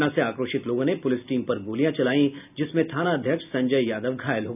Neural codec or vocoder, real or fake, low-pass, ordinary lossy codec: none; real; 3.6 kHz; none